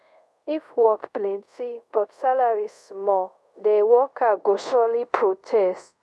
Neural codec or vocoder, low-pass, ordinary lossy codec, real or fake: codec, 24 kHz, 0.5 kbps, DualCodec; none; none; fake